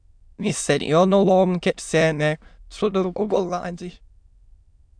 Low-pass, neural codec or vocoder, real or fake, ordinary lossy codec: 9.9 kHz; autoencoder, 22.05 kHz, a latent of 192 numbers a frame, VITS, trained on many speakers; fake; MP3, 96 kbps